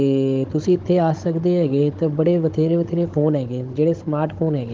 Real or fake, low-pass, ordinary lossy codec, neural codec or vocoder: fake; 7.2 kHz; Opus, 16 kbps; codec, 16 kHz, 16 kbps, FunCodec, trained on Chinese and English, 50 frames a second